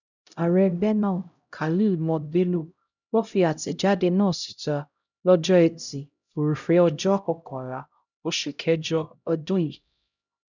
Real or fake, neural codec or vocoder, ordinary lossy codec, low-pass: fake; codec, 16 kHz, 0.5 kbps, X-Codec, HuBERT features, trained on LibriSpeech; none; 7.2 kHz